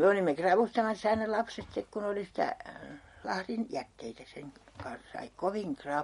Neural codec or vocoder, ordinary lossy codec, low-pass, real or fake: none; MP3, 48 kbps; 19.8 kHz; real